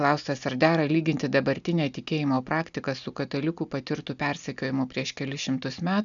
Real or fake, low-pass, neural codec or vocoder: real; 7.2 kHz; none